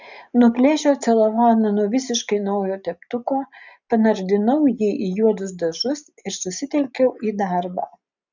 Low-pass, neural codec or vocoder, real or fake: 7.2 kHz; none; real